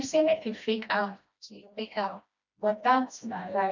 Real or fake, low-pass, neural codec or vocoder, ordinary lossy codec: fake; 7.2 kHz; codec, 16 kHz, 1 kbps, FreqCodec, smaller model; none